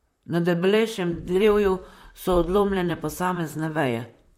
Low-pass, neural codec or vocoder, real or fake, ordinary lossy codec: 19.8 kHz; vocoder, 44.1 kHz, 128 mel bands, Pupu-Vocoder; fake; MP3, 64 kbps